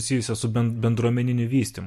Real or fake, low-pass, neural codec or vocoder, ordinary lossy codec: real; 14.4 kHz; none; MP3, 64 kbps